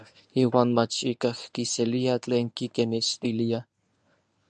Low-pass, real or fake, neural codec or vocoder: 10.8 kHz; fake; codec, 24 kHz, 0.9 kbps, WavTokenizer, medium speech release version 1